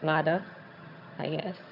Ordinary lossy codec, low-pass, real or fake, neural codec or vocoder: none; 5.4 kHz; fake; vocoder, 22.05 kHz, 80 mel bands, HiFi-GAN